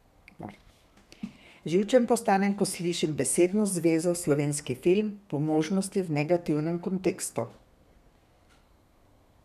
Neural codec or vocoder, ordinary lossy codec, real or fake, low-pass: codec, 32 kHz, 1.9 kbps, SNAC; none; fake; 14.4 kHz